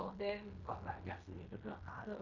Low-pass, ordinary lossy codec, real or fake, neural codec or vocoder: 7.2 kHz; none; fake; codec, 16 kHz in and 24 kHz out, 0.9 kbps, LongCat-Audio-Codec, fine tuned four codebook decoder